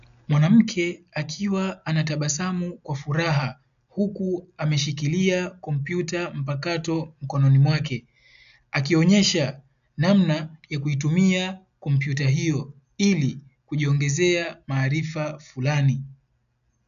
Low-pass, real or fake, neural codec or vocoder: 7.2 kHz; real; none